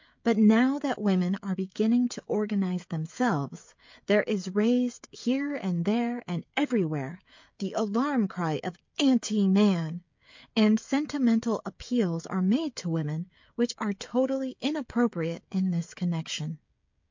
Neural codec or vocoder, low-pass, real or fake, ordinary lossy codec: codec, 16 kHz, 16 kbps, FreqCodec, smaller model; 7.2 kHz; fake; MP3, 48 kbps